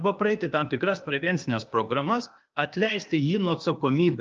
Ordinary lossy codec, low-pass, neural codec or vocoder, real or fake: Opus, 24 kbps; 7.2 kHz; codec, 16 kHz, 0.8 kbps, ZipCodec; fake